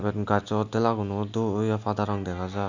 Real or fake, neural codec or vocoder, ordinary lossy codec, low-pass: real; none; none; 7.2 kHz